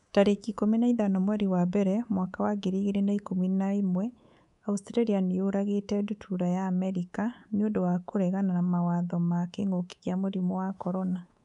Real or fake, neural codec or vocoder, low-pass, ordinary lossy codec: fake; codec, 24 kHz, 3.1 kbps, DualCodec; 10.8 kHz; none